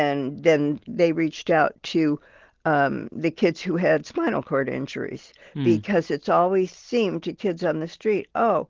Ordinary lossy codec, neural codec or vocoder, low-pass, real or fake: Opus, 16 kbps; none; 7.2 kHz; real